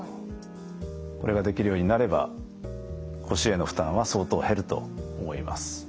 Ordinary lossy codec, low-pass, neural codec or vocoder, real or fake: none; none; none; real